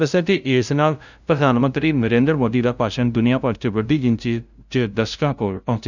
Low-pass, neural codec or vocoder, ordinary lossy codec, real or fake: 7.2 kHz; codec, 16 kHz, 0.5 kbps, FunCodec, trained on LibriTTS, 25 frames a second; none; fake